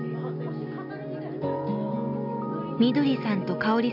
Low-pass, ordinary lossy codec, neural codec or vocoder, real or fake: 5.4 kHz; none; none; real